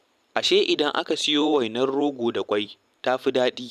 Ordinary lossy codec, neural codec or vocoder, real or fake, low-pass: none; vocoder, 44.1 kHz, 128 mel bands every 512 samples, BigVGAN v2; fake; 14.4 kHz